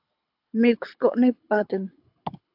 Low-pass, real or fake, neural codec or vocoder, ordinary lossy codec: 5.4 kHz; fake; codec, 24 kHz, 6 kbps, HILCodec; AAC, 48 kbps